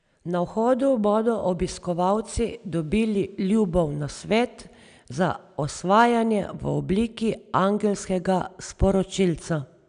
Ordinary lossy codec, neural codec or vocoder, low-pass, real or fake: none; none; 9.9 kHz; real